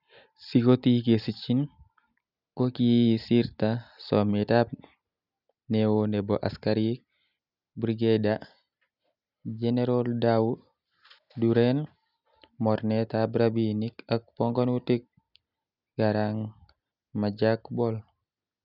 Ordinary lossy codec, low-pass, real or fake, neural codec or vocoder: none; 5.4 kHz; real; none